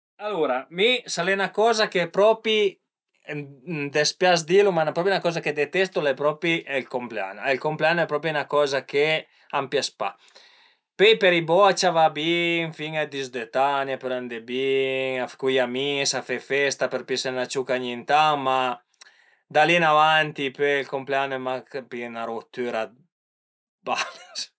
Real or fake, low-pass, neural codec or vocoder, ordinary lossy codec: real; none; none; none